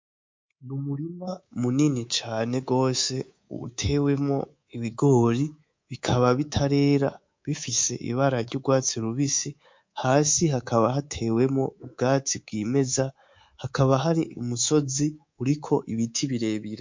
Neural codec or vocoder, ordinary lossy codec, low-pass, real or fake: codec, 24 kHz, 3.1 kbps, DualCodec; MP3, 48 kbps; 7.2 kHz; fake